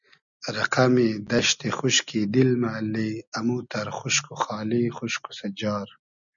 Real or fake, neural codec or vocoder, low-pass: real; none; 7.2 kHz